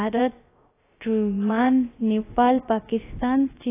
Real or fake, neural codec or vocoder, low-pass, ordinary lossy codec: fake; codec, 16 kHz, about 1 kbps, DyCAST, with the encoder's durations; 3.6 kHz; AAC, 16 kbps